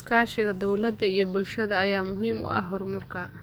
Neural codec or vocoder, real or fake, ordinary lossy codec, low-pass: codec, 44.1 kHz, 2.6 kbps, SNAC; fake; none; none